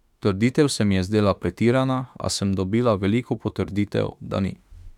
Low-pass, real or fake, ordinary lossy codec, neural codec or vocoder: 19.8 kHz; fake; none; autoencoder, 48 kHz, 32 numbers a frame, DAC-VAE, trained on Japanese speech